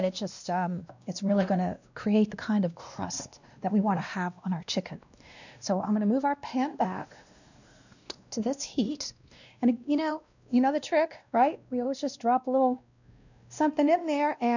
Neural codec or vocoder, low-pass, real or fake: codec, 16 kHz, 1 kbps, X-Codec, WavLM features, trained on Multilingual LibriSpeech; 7.2 kHz; fake